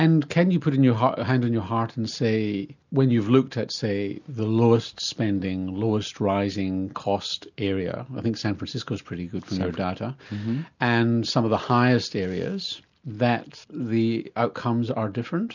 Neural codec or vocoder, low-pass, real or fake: none; 7.2 kHz; real